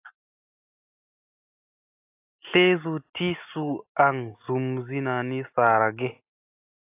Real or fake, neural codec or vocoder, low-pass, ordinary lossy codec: real; none; 3.6 kHz; AAC, 32 kbps